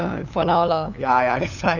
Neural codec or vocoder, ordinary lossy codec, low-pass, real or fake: codec, 16 kHz, 8 kbps, FunCodec, trained on LibriTTS, 25 frames a second; none; 7.2 kHz; fake